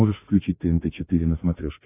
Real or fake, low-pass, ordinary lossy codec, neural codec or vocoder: fake; 3.6 kHz; AAC, 24 kbps; autoencoder, 48 kHz, 32 numbers a frame, DAC-VAE, trained on Japanese speech